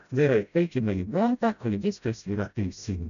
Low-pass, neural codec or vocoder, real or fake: 7.2 kHz; codec, 16 kHz, 0.5 kbps, FreqCodec, smaller model; fake